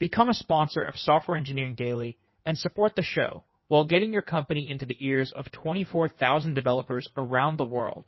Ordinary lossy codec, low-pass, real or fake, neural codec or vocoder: MP3, 24 kbps; 7.2 kHz; fake; codec, 16 kHz in and 24 kHz out, 1.1 kbps, FireRedTTS-2 codec